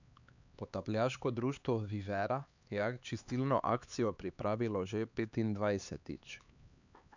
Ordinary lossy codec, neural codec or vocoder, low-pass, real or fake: none; codec, 16 kHz, 4 kbps, X-Codec, HuBERT features, trained on LibriSpeech; 7.2 kHz; fake